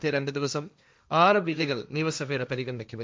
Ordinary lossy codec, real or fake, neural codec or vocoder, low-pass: none; fake; codec, 16 kHz, 1.1 kbps, Voila-Tokenizer; none